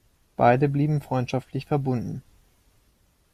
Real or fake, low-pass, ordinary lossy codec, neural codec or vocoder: real; 14.4 kHz; Opus, 64 kbps; none